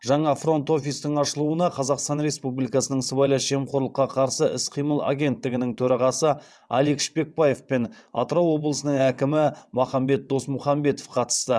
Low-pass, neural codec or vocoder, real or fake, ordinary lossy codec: none; vocoder, 22.05 kHz, 80 mel bands, WaveNeXt; fake; none